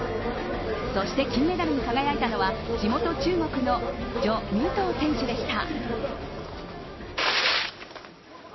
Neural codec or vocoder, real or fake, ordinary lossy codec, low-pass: none; real; MP3, 24 kbps; 7.2 kHz